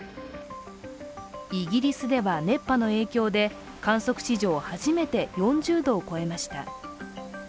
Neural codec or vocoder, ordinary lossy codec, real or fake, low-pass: none; none; real; none